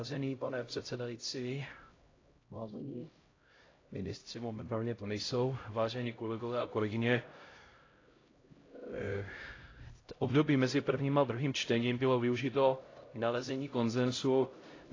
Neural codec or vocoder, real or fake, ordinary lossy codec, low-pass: codec, 16 kHz, 0.5 kbps, X-Codec, HuBERT features, trained on LibriSpeech; fake; AAC, 32 kbps; 7.2 kHz